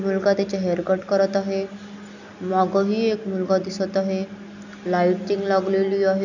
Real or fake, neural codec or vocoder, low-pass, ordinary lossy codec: real; none; 7.2 kHz; none